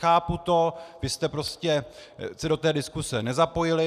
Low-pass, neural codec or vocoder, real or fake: 14.4 kHz; none; real